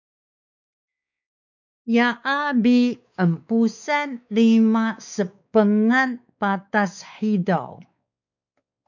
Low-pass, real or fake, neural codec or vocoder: 7.2 kHz; fake; codec, 16 kHz, 4 kbps, X-Codec, WavLM features, trained on Multilingual LibriSpeech